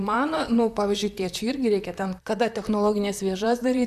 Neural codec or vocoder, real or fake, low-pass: vocoder, 44.1 kHz, 128 mel bands, Pupu-Vocoder; fake; 14.4 kHz